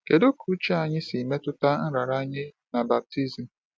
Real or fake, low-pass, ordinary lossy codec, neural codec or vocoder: real; none; none; none